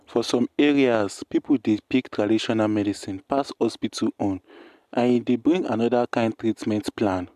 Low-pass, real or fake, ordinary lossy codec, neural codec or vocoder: 14.4 kHz; real; MP3, 96 kbps; none